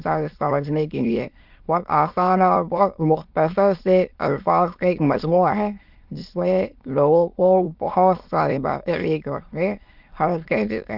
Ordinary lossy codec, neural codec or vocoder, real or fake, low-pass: Opus, 32 kbps; autoencoder, 22.05 kHz, a latent of 192 numbers a frame, VITS, trained on many speakers; fake; 5.4 kHz